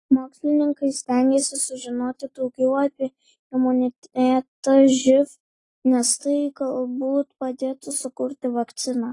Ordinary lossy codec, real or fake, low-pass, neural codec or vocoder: AAC, 32 kbps; real; 10.8 kHz; none